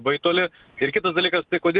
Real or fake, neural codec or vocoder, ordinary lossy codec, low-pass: real; none; Opus, 32 kbps; 10.8 kHz